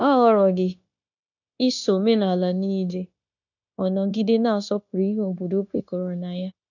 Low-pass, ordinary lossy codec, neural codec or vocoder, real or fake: 7.2 kHz; none; codec, 16 kHz, 0.9 kbps, LongCat-Audio-Codec; fake